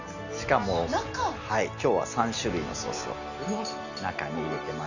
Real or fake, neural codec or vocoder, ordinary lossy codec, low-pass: real; none; none; 7.2 kHz